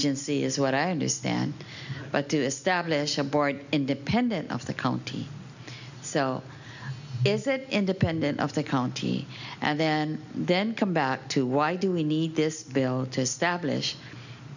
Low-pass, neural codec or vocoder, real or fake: 7.2 kHz; none; real